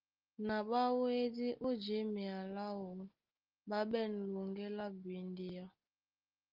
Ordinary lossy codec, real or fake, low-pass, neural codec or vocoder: Opus, 32 kbps; real; 5.4 kHz; none